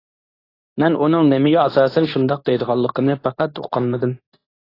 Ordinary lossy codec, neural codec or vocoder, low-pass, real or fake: AAC, 24 kbps; codec, 24 kHz, 0.9 kbps, WavTokenizer, medium speech release version 2; 5.4 kHz; fake